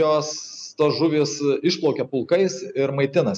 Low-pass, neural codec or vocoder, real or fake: 9.9 kHz; none; real